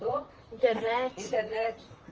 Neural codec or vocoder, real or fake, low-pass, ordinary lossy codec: vocoder, 44.1 kHz, 128 mel bands, Pupu-Vocoder; fake; 7.2 kHz; Opus, 24 kbps